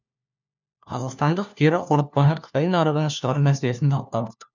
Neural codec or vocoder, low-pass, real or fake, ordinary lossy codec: codec, 16 kHz, 1 kbps, FunCodec, trained on LibriTTS, 50 frames a second; 7.2 kHz; fake; none